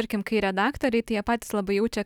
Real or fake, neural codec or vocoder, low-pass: real; none; 19.8 kHz